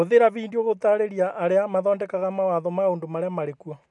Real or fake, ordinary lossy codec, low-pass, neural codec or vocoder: real; none; 10.8 kHz; none